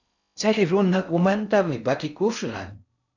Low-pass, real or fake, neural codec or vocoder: 7.2 kHz; fake; codec, 16 kHz in and 24 kHz out, 0.6 kbps, FocalCodec, streaming, 4096 codes